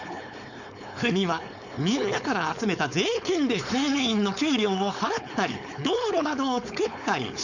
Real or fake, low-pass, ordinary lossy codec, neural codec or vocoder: fake; 7.2 kHz; none; codec, 16 kHz, 4.8 kbps, FACodec